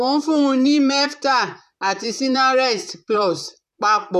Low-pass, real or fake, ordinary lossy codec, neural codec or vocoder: 14.4 kHz; fake; none; vocoder, 44.1 kHz, 128 mel bands, Pupu-Vocoder